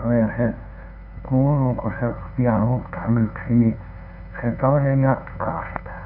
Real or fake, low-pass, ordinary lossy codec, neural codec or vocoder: fake; 5.4 kHz; none; codec, 16 kHz, 2 kbps, FunCodec, trained on LibriTTS, 25 frames a second